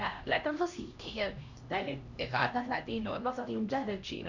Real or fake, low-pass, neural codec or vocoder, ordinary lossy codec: fake; 7.2 kHz; codec, 16 kHz, 1 kbps, X-Codec, HuBERT features, trained on LibriSpeech; none